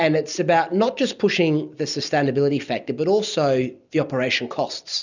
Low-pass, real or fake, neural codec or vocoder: 7.2 kHz; real; none